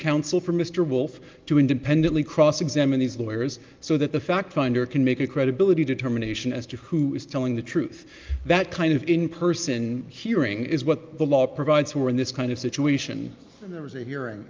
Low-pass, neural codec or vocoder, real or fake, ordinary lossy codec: 7.2 kHz; none; real; Opus, 24 kbps